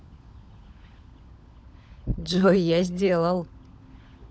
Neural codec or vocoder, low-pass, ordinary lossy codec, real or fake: codec, 16 kHz, 16 kbps, FunCodec, trained on LibriTTS, 50 frames a second; none; none; fake